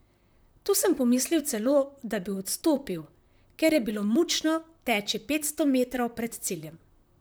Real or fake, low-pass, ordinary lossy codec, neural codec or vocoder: fake; none; none; vocoder, 44.1 kHz, 128 mel bands, Pupu-Vocoder